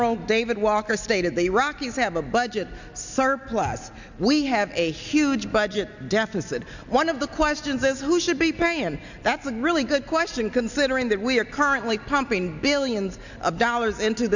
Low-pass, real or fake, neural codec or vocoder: 7.2 kHz; real; none